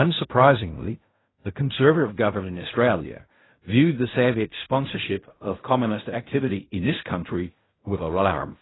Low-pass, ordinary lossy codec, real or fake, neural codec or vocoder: 7.2 kHz; AAC, 16 kbps; fake; codec, 16 kHz in and 24 kHz out, 0.4 kbps, LongCat-Audio-Codec, fine tuned four codebook decoder